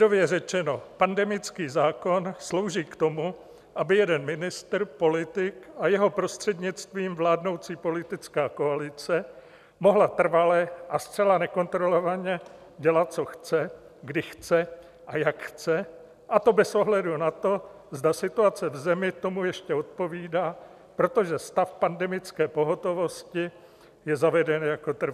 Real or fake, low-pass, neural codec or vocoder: real; 14.4 kHz; none